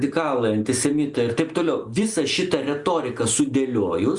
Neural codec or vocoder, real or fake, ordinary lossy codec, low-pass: none; real; Opus, 64 kbps; 10.8 kHz